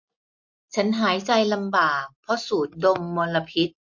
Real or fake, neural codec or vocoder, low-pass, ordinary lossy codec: real; none; 7.2 kHz; MP3, 48 kbps